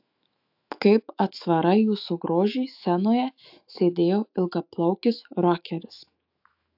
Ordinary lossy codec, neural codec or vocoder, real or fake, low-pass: AAC, 48 kbps; autoencoder, 48 kHz, 128 numbers a frame, DAC-VAE, trained on Japanese speech; fake; 5.4 kHz